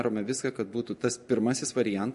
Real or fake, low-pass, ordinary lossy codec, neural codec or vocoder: real; 14.4 kHz; MP3, 48 kbps; none